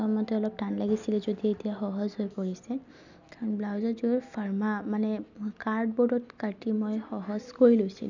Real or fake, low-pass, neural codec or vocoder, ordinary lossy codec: real; 7.2 kHz; none; none